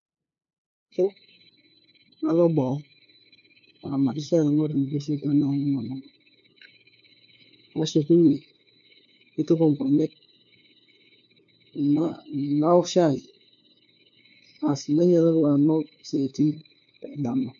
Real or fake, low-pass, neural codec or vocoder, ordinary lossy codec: fake; 7.2 kHz; codec, 16 kHz, 2 kbps, FunCodec, trained on LibriTTS, 25 frames a second; MP3, 48 kbps